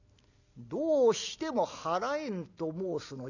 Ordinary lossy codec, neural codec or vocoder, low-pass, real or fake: none; none; 7.2 kHz; real